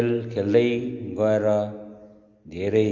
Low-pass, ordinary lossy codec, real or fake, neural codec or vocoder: 7.2 kHz; Opus, 32 kbps; real; none